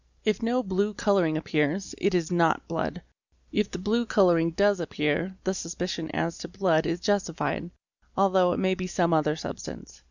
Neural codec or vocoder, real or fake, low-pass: none; real; 7.2 kHz